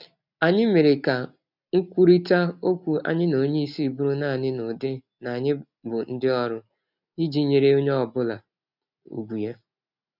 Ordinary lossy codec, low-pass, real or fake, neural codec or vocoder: none; 5.4 kHz; real; none